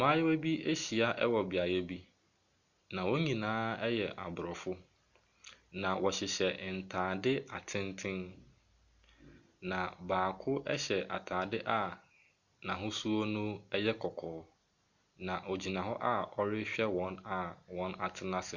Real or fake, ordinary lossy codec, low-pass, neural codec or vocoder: real; Opus, 64 kbps; 7.2 kHz; none